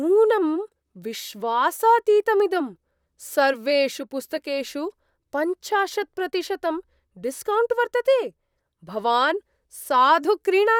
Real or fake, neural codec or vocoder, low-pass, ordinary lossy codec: fake; vocoder, 44.1 kHz, 128 mel bands, Pupu-Vocoder; 19.8 kHz; none